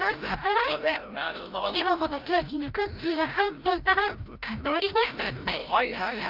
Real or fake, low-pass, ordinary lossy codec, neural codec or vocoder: fake; 5.4 kHz; Opus, 32 kbps; codec, 16 kHz, 0.5 kbps, FreqCodec, larger model